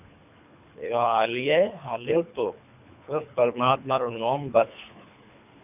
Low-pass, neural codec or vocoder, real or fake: 3.6 kHz; codec, 24 kHz, 3 kbps, HILCodec; fake